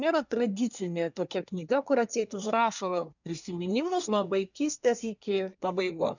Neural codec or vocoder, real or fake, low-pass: codec, 24 kHz, 1 kbps, SNAC; fake; 7.2 kHz